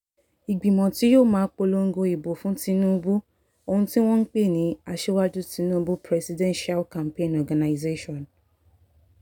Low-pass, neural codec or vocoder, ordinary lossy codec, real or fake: 19.8 kHz; none; none; real